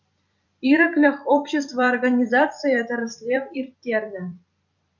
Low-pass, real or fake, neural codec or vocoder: 7.2 kHz; fake; vocoder, 44.1 kHz, 128 mel bands every 256 samples, BigVGAN v2